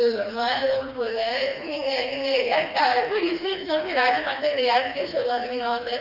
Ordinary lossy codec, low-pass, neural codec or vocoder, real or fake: none; 5.4 kHz; codec, 16 kHz, 2 kbps, FreqCodec, smaller model; fake